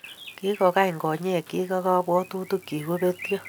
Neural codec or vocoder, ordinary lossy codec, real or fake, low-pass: none; none; real; none